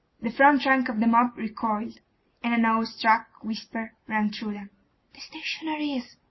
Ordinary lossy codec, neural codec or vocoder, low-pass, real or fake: MP3, 24 kbps; none; 7.2 kHz; real